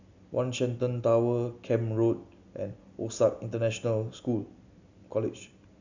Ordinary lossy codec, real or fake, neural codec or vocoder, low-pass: none; real; none; 7.2 kHz